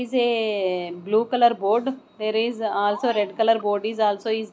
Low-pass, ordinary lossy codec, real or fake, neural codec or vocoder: none; none; real; none